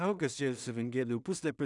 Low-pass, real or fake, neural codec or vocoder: 10.8 kHz; fake; codec, 16 kHz in and 24 kHz out, 0.4 kbps, LongCat-Audio-Codec, two codebook decoder